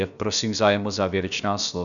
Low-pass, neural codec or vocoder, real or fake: 7.2 kHz; codec, 16 kHz, about 1 kbps, DyCAST, with the encoder's durations; fake